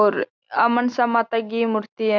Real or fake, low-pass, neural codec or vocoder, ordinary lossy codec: real; 7.2 kHz; none; none